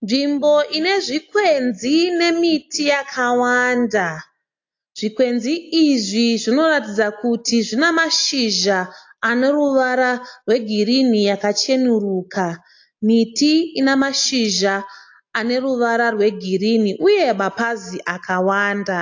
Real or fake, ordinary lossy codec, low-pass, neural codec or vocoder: real; AAC, 48 kbps; 7.2 kHz; none